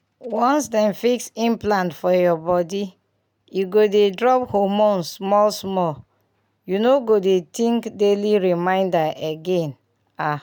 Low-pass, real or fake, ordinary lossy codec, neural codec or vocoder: none; real; none; none